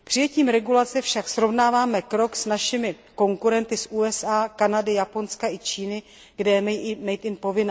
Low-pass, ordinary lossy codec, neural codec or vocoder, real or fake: none; none; none; real